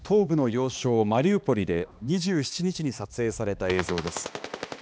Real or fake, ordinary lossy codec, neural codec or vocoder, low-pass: fake; none; codec, 16 kHz, 2 kbps, X-Codec, HuBERT features, trained on balanced general audio; none